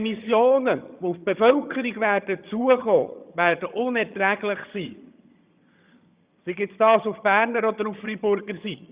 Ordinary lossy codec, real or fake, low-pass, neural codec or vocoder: Opus, 64 kbps; fake; 3.6 kHz; vocoder, 22.05 kHz, 80 mel bands, HiFi-GAN